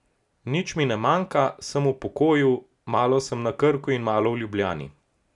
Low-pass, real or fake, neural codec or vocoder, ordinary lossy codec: 10.8 kHz; real; none; none